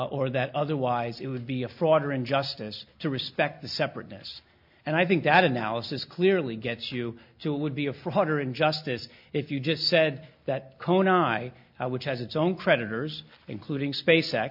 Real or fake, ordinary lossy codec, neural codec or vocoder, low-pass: real; MP3, 48 kbps; none; 5.4 kHz